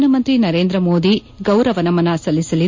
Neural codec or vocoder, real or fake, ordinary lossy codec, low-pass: none; real; MP3, 48 kbps; 7.2 kHz